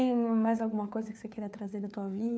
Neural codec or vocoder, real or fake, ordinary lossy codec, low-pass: codec, 16 kHz, 4 kbps, FunCodec, trained on LibriTTS, 50 frames a second; fake; none; none